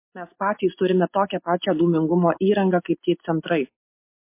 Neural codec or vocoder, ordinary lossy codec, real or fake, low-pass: none; MP3, 24 kbps; real; 3.6 kHz